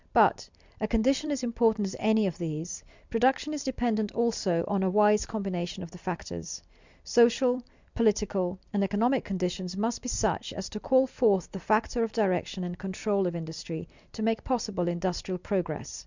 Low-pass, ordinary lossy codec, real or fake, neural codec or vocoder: 7.2 kHz; Opus, 64 kbps; fake; vocoder, 44.1 kHz, 128 mel bands every 256 samples, BigVGAN v2